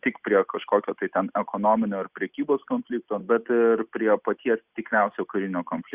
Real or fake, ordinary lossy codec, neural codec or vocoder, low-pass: real; Opus, 64 kbps; none; 3.6 kHz